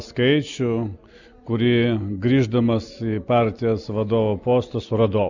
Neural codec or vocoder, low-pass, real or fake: none; 7.2 kHz; real